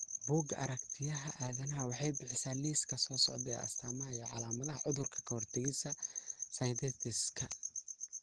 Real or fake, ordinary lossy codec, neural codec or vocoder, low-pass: real; Opus, 16 kbps; none; 9.9 kHz